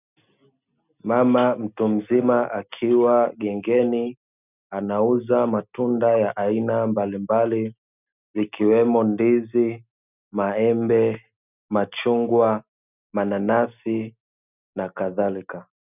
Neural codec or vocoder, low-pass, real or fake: none; 3.6 kHz; real